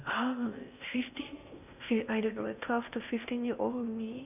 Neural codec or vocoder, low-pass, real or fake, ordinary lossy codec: codec, 16 kHz in and 24 kHz out, 0.6 kbps, FocalCodec, streaming, 4096 codes; 3.6 kHz; fake; none